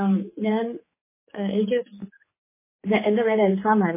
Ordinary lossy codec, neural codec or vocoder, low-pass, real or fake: MP3, 16 kbps; codec, 16 kHz, 4 kbps, X-Codec, HuBERT features, trained on general audio; 3.6 kHz; fake